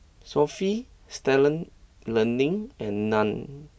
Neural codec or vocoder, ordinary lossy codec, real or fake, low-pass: none; none; real; none